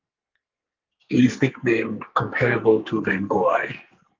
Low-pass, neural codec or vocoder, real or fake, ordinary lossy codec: 7.2 kHz; codec, 44.1 kHz, 2.6 kbps, SNAC; fake; Opus, 24 kbps